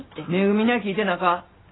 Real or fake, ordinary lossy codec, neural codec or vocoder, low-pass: real; AAC, 16 kbps; none; 7.2 kHz